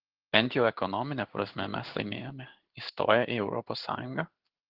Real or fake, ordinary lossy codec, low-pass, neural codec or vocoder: fake; Opus, 16 kbps; 5.4 kHz; codec, 16 kHz in and 24 kHz out, 1 kbps, XY-Tokenizer